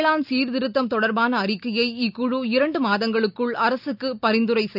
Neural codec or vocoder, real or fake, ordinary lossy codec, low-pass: none; real; none; 5.4 kHz